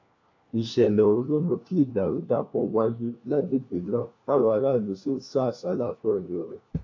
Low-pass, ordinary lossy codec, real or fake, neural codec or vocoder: 7.2 kHz; AAC, 48 kbps; fake; codec, 16 kHz, 1 kbps, FunCodec, trained on LibriTTS, 50 frames a second